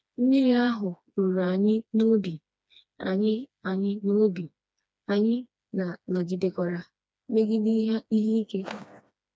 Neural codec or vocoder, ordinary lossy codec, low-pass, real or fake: codec, 16 kHz, 2 kbps, FreqCodec, smaller model; none; none; fake